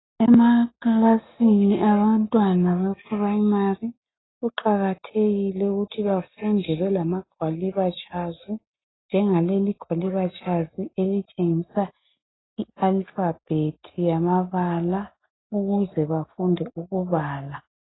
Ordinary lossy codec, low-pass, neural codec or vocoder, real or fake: AAC, 16 kbps; 7.2 kHz; none; real